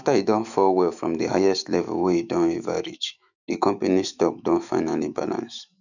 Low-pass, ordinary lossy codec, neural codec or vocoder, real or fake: 7.2 kHz; none; autoencoder, 48 kHz, 128 numbers a frame, DAC-VAE, trained on Japanese speech; fake